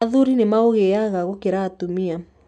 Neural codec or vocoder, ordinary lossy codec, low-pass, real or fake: none; none; none; real